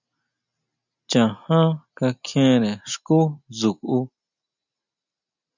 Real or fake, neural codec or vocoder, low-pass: real; none; 7.2 kHz